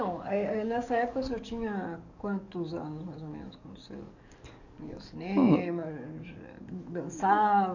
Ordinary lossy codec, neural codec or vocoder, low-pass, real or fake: none; codec, 44.1 kHz, 7.8 kbps, DAC; 7.2 kHz; fake